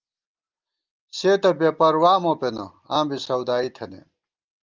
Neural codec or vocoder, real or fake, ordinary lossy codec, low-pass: none; real; Opus, 32 kbps; 7.2 kHz